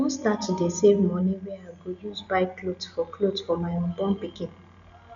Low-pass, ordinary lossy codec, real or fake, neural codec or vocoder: 7.2 kHz; none; real; none